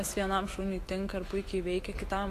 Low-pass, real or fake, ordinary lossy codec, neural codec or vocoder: 14.4 kHz; fake; AAC, 48 kbps; autoencoder, 48 kHz, 128 numbers a frame, DAC-VAE, trained on Japanese speech